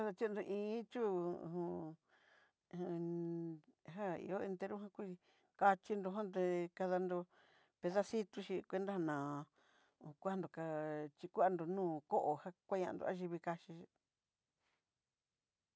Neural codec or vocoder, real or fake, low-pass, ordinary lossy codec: none; real; none; none